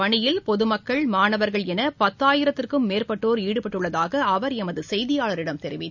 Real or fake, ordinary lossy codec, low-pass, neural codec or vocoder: real; none; 7.2 kHz; none